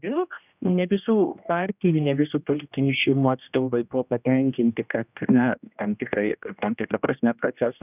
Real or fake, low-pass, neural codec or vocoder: fake; 3.6 kHz; codec, 16 kHz, 1 kbps, X-Codec, HuBERT features, trained on general audio